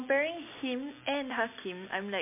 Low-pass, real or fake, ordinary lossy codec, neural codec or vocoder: 3.6 kHz; real; MP3, 24 kbps; none